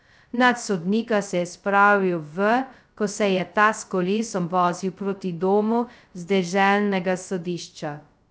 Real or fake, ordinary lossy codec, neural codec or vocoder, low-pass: fake; none; codec, 16 kHz, 0.2 kbps, FocalCodec; none